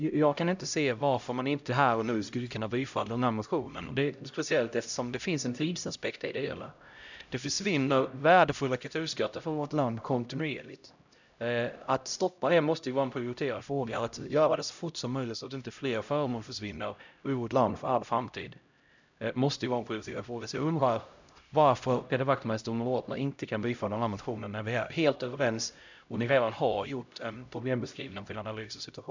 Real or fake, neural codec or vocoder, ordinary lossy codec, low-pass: fake; codec, 16 kHz, 0.5 kbps, X-Codec, HuBERT features, trained on LibriSpeech; none; 7.2 kHz